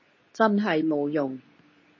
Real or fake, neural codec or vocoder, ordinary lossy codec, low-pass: fake; codec, 24 kHz, 0.9 kbps, WavTokenizer, medium speech release version 2; MP3, 32 kbps; 7.2 kHz